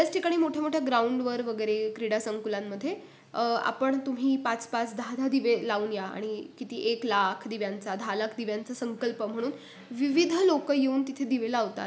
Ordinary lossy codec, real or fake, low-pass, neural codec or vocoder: none; real; none; none